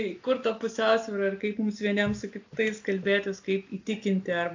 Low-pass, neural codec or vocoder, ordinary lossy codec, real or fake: 7.2 kHz; none; AAC, 48 kbps; real